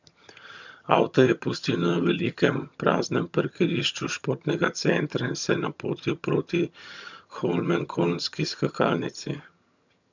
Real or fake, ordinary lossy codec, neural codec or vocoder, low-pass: fake; none; vocoder, 22.05 kHz, 80 mel bands, HiFi-GAN; 7.2 kHz